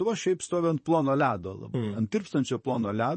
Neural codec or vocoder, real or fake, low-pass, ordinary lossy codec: vocoder, 44.1 kHz, 128 mel bands, Pupu-Vocoder; fake; 10.8 kHz; MP3, 32 kbps